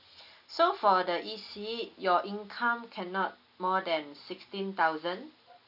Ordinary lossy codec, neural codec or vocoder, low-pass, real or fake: none; none; 5.4 kHz; real